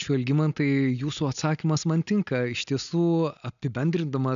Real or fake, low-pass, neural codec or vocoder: real; 7.2 kHz; none